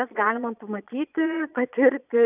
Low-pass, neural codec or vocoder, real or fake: 3.6 kHz; vocoder, 22.05 kHz, 80 mel bands, Vocos; fake